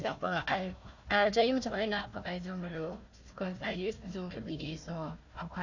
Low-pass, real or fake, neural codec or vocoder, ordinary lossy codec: 7.2 kHz; fake; codec, 16 kHz, 1 kbps, FunCodec, trained on Chinese and English, 50 frames a second; none